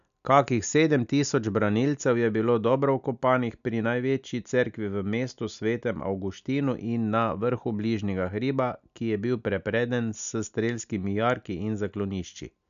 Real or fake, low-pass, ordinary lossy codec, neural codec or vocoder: real; 7.2 kHz; none; none